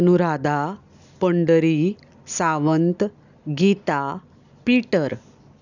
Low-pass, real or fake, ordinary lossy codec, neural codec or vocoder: 7.2 kHz; real; none; none